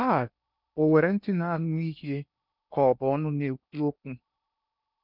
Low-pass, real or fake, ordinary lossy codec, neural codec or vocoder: 5.4 kHz; fake; none; codec, 16 kHz in and 24 kHz out, 0.8 kbps, FocalCodec, streaming, 65536 codes